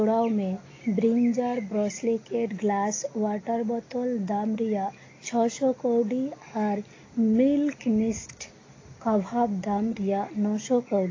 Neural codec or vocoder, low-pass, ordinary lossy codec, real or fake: none; 7.2 kHz; AAC, 32 kbps; real